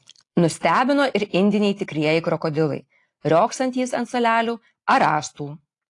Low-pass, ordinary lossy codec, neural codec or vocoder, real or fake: 10.8 kHz; AAC, 48 kbps; none; real